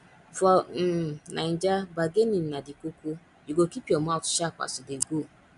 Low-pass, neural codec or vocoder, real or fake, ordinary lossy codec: 10.8 kHz; none; real; none